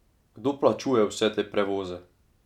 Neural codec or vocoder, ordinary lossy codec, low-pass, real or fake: none; none; 19.8 kHz; real